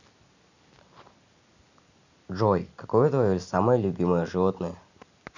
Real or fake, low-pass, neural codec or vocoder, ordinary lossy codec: real; 7.2 kHz; none; none